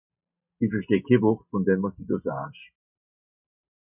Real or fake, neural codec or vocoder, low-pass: real; none; 3.6 kHz